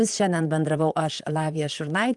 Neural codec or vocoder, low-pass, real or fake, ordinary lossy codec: vocoder, 44.1 kHz, 128 mel bands, Pupu-Vocoder; 10.8 kHz; fake; Opus, 32 kbps